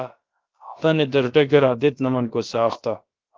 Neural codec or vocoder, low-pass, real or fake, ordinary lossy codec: codec, 16 kHz, about 1 kbps, DyCAST, with the encoder's durations; 7.2 kHz; fake; Opus, 32 kbps